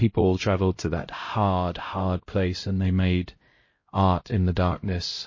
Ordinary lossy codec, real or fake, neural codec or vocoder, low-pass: MP3, 32 kbps; fake; codec, 16 kHz, 0.5 kbps, X-Codec, HuBERT features, trained on LibriSpeech; 7.2 kHz